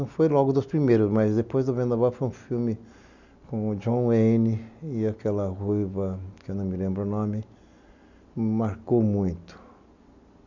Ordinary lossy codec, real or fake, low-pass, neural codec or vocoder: none; real; 7.2 kHz; none